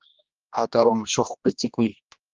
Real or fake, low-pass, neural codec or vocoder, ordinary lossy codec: fake; 7.2 kHz; codec, 16 kHz, 1 kbps, X-Codec, HuBERT features, trained on general audio; Opus, 32 kbps